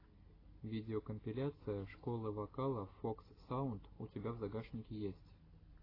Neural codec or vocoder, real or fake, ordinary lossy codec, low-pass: none; real; AAC, 24 kbps; 5.4 kHz